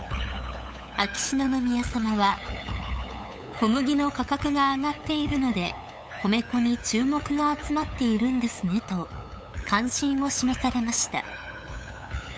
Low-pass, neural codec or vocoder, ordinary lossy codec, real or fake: none; codec, 16 kHz, 8 kbps, FunCodec, trained on LibriTTS, 25 frames a second; none; fake